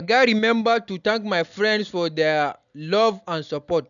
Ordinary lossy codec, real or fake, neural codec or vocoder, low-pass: none; real; none; 7.2 kHz